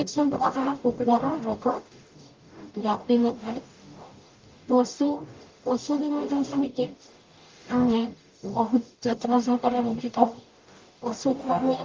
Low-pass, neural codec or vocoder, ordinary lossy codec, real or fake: 7.2 kHz; codec, 44.1 kHz, 0.9 kbps, DAC; Opus, 32 kbps; fake